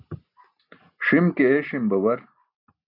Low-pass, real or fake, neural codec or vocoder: 5.4 kHz; real; none